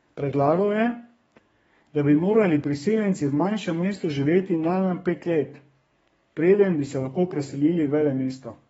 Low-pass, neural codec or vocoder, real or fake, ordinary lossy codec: 14.4 kHz; codec, 32 kHz, 1.9 kbps, SNAC; fake; AAC, 24 kbps